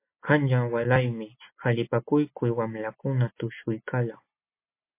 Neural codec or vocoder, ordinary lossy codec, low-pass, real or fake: none; MP3, 24 kbps; 3.6 kHz; real